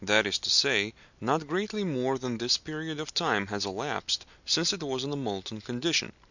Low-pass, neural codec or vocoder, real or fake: 7.2 kHz; none; real